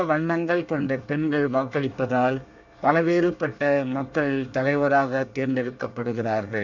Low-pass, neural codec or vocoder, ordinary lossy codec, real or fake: 7.2 kHz; codec, 24 kHz, 1 kbps, SNAC; none; fake